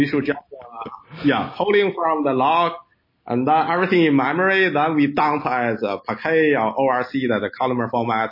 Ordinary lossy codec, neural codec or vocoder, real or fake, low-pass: MP3, 24 kbps; none; real; 5.4 kHz